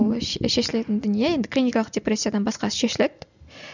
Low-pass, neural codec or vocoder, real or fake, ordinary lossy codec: 7.2 kHz; none; real; none